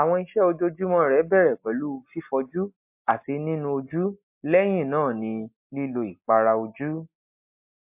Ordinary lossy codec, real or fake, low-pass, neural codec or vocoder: MP3, 32 kbps; real; 3.6 kHz; none